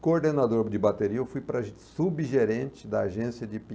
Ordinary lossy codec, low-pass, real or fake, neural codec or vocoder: none; none; real; none